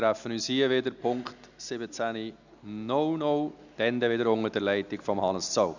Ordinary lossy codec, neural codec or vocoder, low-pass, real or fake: none; none; 7.2 kHz; real